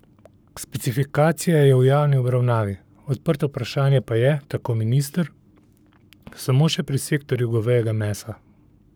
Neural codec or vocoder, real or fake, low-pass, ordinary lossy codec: codec, 44.1 kHz, 7.8 kbps, Pupu-Codec; fake; none; none